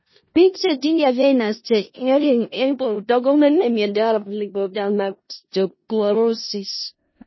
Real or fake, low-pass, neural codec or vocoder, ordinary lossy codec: fake; 7.2 kHz; codec, 16 kHz in and 24 kHz out, 0.4 kbps, LongCat-Audio-Codec, four codebook decoder; MP3, 24 kbps